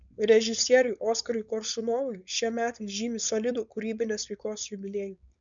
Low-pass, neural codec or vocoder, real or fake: 7.2 kHz; codec, 16 kHz, 4.8 kbps, FACodec; fake